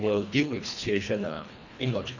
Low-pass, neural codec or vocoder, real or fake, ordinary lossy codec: 7.2 kHz; codec, 24 kHz, 1.5 kbps, HILCodec; fake; none